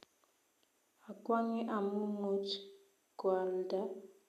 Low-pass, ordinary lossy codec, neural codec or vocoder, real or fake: 14.4 kHz; none; none; real